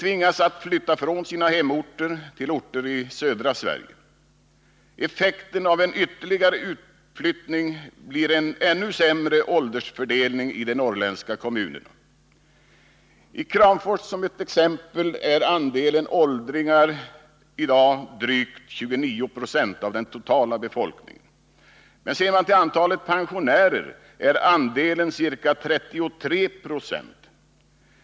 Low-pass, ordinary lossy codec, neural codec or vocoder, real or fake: none; none; none; real